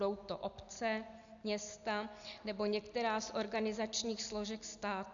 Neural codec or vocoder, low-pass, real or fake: none; 7.2 kHz; real